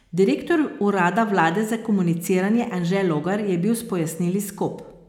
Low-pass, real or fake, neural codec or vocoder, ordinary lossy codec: 19.8 kHz; real; none; none